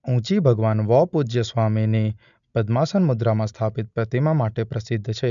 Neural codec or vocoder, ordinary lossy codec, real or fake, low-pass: none; none; real; 7.2 kHz